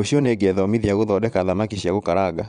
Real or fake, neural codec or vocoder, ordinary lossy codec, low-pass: fake; vocoder, 22.05 kHz, 80 mel bands, Vocos; none; 9.9 kHz